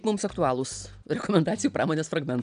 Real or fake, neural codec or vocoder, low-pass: real; none; 9.9 kHz